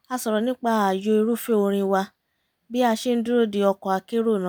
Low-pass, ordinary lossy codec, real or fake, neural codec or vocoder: none; none; real; none